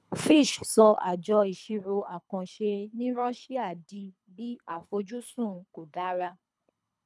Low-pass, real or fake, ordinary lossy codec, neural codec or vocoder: none; fake; none; codec, 24 kHz, 3 kbps, HILCodec